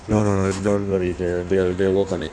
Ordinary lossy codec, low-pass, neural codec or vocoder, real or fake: none; 9.9 kHz; codec, 16 kHz in and 24 kHz out, 1.1 kbps, FireRedTTS-2 codec; fake